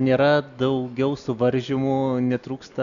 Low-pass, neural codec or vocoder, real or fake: 7.2 kHz; none; real